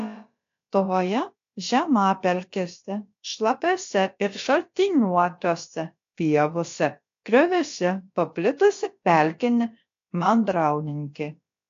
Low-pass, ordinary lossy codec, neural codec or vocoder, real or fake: 7.2 kHz; AAC, 48 kbps; codec, 16 kHz, about 1 kbps, DyCAST, with the encoder's durations; fake